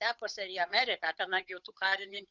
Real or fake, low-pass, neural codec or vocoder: fake; 7.2 kHz; codec, 16 kHz, 8 kbps, FunCodec, trained on Chinese and English, 25 frames a second